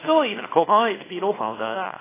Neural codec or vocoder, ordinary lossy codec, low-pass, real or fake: codec, 24 kHz, 0.9 kbps, WavTokenizer, small release; AAC, 16 kbps; 3.6 kHz; fake